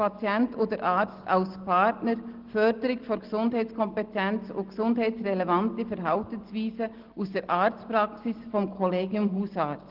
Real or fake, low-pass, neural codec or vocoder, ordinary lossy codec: real; 5.4 kHz; none; Opus, 16 kbps